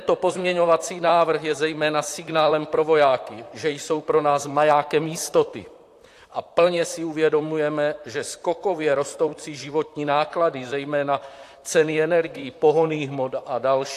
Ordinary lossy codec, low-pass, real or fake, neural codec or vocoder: AAC, 64 kbps; 14.4 kHz; fake; vocoder, 44.1 kHz, 128 mel bands, Pupu-Vocoder